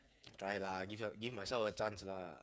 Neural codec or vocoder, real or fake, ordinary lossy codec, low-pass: codec, 16 kHz, 8 kbps, FreqCodec, smaller model; fake; none; none